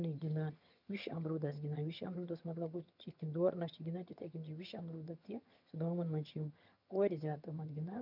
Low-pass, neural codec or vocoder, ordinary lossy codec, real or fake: 5.4 kHz; vocoder, 22.05 kHz, 80 mel bands, HiFi-GAN; none; fake